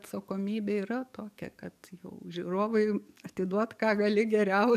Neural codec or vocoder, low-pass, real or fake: codec, 44.1 kHz, 7.8 kbps, DAC; 14.4 kHz; fake